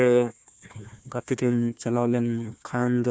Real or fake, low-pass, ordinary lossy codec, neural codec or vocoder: fake; none; none; codec, 16 kHz, 1 kbps, FunCodec, trained on Chinese and English, 50 frames a second